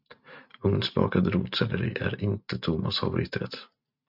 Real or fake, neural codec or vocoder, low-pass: real; none; 5.4 kHz